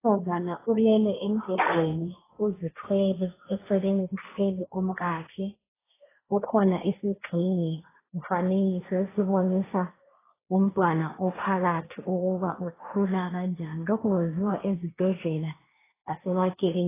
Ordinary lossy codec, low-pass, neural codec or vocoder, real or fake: AAC, 16 kbps; 3.6 kHz; codec, 16 kHz, 1.1 kbps, Voila-Tokenizer; fake